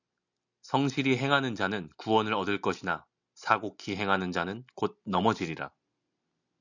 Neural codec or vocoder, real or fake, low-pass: none; real; 7.2 kHz